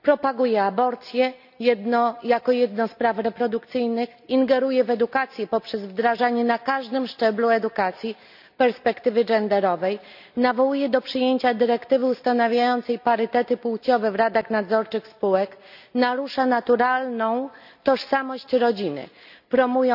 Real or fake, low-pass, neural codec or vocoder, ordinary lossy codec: real; 5.4 kHz; none; none